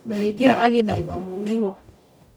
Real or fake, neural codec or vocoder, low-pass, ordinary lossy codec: fake; codec, 44.1 kHz, 0.9 kbps, DAC; none; none